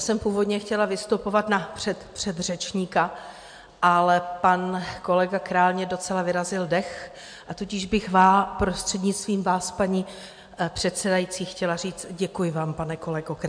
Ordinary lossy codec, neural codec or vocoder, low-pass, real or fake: MP3, 64 kbps; none; 9.9 kHz; real